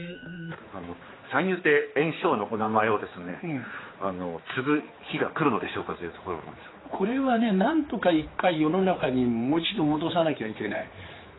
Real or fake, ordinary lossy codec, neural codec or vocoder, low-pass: fake; AAC, 16 kbps; codec, 16 kHz, 4 kbps, X-Codec, HuBERT features, trained on general audio; 7.2 kHz